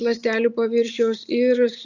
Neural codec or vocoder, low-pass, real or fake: none; 7.2 kHz; real